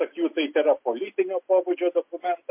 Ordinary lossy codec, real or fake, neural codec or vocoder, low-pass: MP3, 32 kbps; real; none; 3.6 kHz